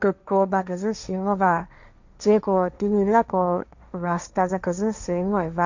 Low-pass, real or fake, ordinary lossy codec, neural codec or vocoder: 7.2 kHz; fake; none; codec, 16 kHz, 1.1 kbps, Voila-Tokenizer